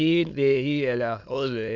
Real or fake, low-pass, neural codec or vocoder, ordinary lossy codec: fake; 7.2 kHz; autoencoder, 22.05 kHz, a latent of 192 numbers a frame, VITS, trained on many speakers; none